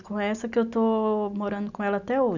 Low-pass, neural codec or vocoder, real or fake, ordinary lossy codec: 7.2 kHz; none; real; none